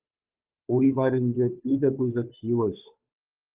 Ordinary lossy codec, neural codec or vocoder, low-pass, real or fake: Opus, 24 kbps; codec, 16 kHz, 2 kbps, FunCodec, trained on Chinese and English, 25 frames a second; 3.6 kHz; fake